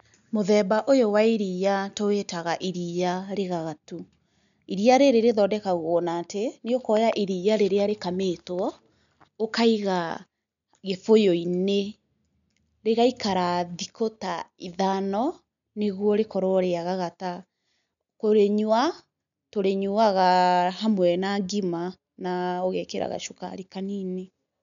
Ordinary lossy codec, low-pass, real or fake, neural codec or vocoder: none; 7.2 kHz; real; none